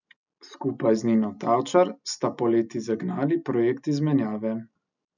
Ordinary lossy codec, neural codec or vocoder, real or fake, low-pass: none; none; real; 7.2 kHz